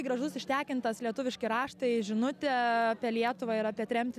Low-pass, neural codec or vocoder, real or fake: 14.4 kHz; none; real